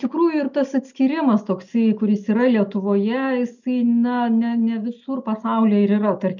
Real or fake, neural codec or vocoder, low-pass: real; none; 7.2 kHz